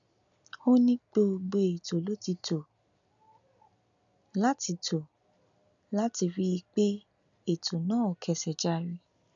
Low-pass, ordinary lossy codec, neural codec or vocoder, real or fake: 7.2 kHz; none; none; real